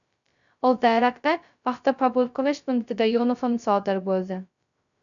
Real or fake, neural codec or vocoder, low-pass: fake; codec, 16 kHz, 0.2 kbps, FocalCodec; 7.2 kHz